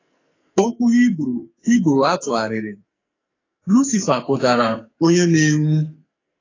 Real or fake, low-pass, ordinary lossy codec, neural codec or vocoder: fake; 7.2 kHz; AAC, 32 kbps; codec, 44.1 kHz, 2.6 kbps, SNAC